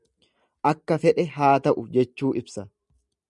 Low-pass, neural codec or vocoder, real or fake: 10.8 kHz; none; real